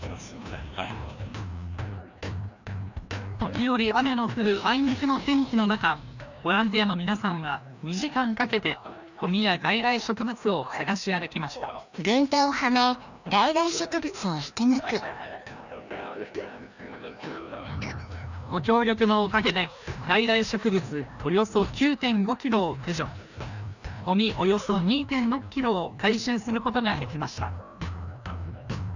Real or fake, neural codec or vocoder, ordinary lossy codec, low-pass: fake; codec, 16 kHz, 1 kbps, FreqCodec, larger model; none; 7.2 kHz